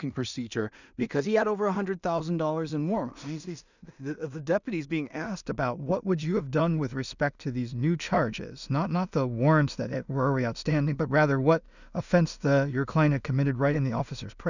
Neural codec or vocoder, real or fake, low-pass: codec, 16 kHz in and 24 kHz out, 0.4 kbps, LongCat-Audio-Codec, two codebook decoder; fake; 7.2 kHz